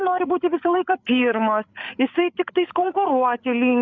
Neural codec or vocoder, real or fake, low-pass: codec, 16 kHz, 8 kbps, FreqCodec, larger model; fake; 7.2 kHz